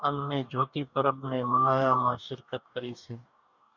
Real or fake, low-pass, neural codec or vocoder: fake; 7.2 kHz; codec, 44.1 kHz, 2.6 kbps, DAC